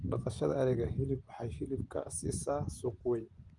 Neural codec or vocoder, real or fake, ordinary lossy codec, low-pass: vocoder, 24 kHz, 100 mel bands, Vocos; fake; Opus, 32 kbps; 10.8 kHz